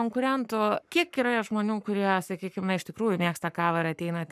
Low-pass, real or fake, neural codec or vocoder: 14.4 kHz; fake; codec, 44.1 kHz, 7.8 kbps, Pupu-Codec